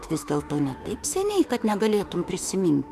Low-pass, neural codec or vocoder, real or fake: 14.4 kHz; autoencoder, 48 kHz, 32 numbers a frame, DAC-VAE, trained on Japanese speech; fake